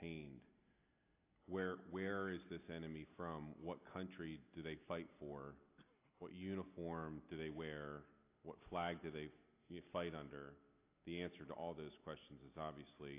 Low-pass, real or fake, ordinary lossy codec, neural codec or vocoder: 3.6 kHz; real; AAC, 24 kbps; none